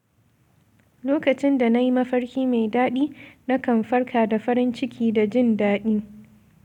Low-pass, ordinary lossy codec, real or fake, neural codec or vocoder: 19.8 kHz; none; real; none